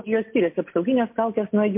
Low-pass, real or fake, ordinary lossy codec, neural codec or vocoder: 3.6 kHz; real; MP3, 32 kbps; none